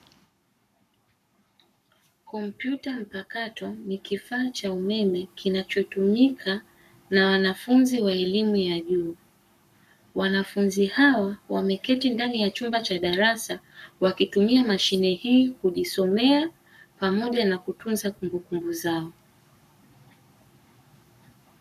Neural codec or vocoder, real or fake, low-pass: codec, 44.1 kHz, 7.8 kbps, Pupu-Codec; fake; 14.4 kHz